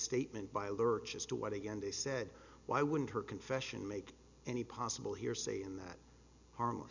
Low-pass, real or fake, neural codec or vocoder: 7.2 kHz; real; none